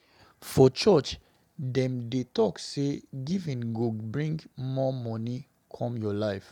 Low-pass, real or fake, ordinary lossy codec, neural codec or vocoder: 19.8 kHz; real; none; none